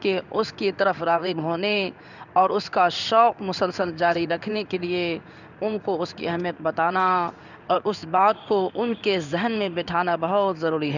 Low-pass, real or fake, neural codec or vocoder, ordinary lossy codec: 7.2 kHz; fake; codec, 16 kHz in and 24 kHz out, 1 kbps, XY-Tokenizer; none